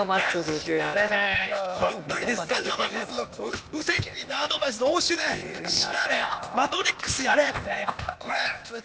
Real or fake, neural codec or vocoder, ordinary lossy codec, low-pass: fake; codec, 16 kHz, 0.8 kbps, ZipCodec; none; none